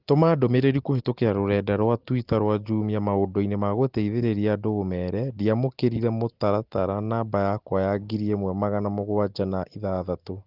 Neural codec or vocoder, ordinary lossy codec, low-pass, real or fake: none; Opus, 16 kbps; 5.4 kHz; real